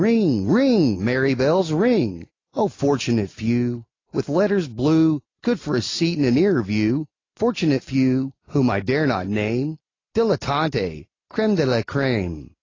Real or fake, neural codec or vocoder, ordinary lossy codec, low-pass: real; none; AAC, 32 kbps; 7.2 kHz